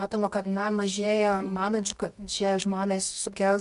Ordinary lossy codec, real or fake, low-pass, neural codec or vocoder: AAC, 96 kbps; fake; 10.8 kHz; codec, 24 kHz, 0.9 kbps, WavTokenizer, medium music audio release